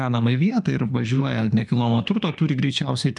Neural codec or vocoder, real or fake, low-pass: autoencoder, 48 kHz, 32 numbers a frame, DAC-VAE, trained on Japanese speech; fake; 10.8 kHz